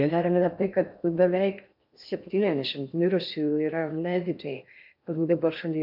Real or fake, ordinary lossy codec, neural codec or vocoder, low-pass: fake; AAC, 48 kbps; codec, 16 kHz in and 24 kHz out, 0.6 kbps, FocalCodec, streaming, 4096 codes; 5.4 kHz